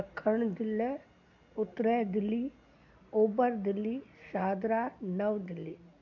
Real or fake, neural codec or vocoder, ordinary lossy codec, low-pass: real; none; MP3, 48 kbps; 7.2 kHz